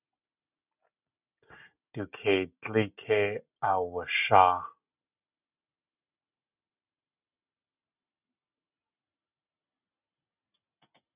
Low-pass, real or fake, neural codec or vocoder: 3.6 kHz; real; none